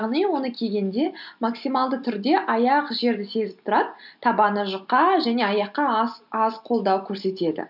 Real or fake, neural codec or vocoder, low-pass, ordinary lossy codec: real; none; 5.4 kHz; none